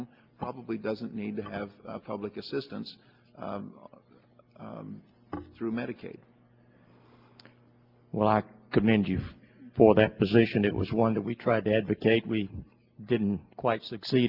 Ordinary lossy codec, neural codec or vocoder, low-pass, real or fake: Opus, 32 kbps; none; 5.4 kHz; real